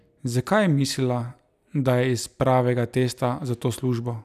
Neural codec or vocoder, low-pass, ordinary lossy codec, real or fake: none; 14.4 kHz; none; real